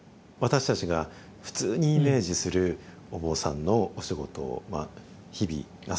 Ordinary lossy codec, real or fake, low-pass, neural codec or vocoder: none; real; none; none